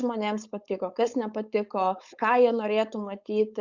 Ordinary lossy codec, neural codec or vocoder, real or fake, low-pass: Opus, 64 kbps; codec, 16 kHz, 4.8 kbps, FACodec; fake; 7.2 kHz